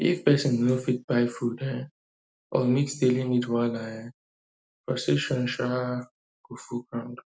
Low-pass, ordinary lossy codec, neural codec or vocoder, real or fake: none; none; none; real